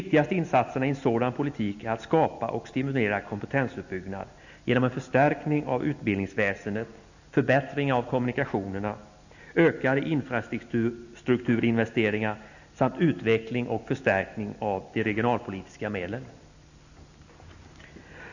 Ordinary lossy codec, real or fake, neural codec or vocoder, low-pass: none; real; none; 7.2 kHz